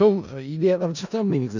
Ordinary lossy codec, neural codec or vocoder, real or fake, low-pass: none; codec, 16 kHz in and 24 kHz out, 0.4 kbps, LongCat-Audio-Codec, four codebook decoder; fake; 7.2 kHz